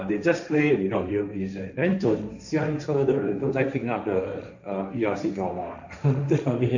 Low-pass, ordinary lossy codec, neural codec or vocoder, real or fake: 7.2 kHz; none; codec, 16 kHz, 1.1 kbps, Voila-Tokenizer; fake